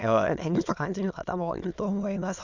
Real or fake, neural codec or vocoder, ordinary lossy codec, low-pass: fake; autoencoder, 22.05 kHz, a latent of 192 numbers a frame, VITS, trained on many speakers; none; 7.2 kHz